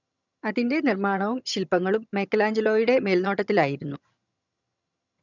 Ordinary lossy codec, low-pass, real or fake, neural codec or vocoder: none; 7.2 kHz; fake; vocoder, 22.05 kHz, 80 mel bands, HiFi-GAN